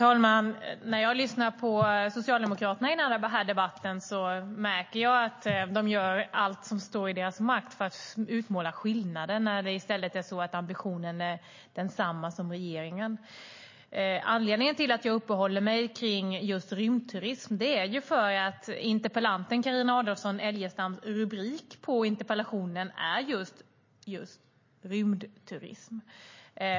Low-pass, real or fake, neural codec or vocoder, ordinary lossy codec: 7.2 kHz; real; none; MP3, 32 kbps